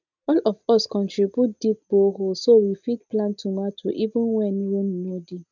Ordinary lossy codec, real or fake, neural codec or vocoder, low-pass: none; real; none; 7.2 kHz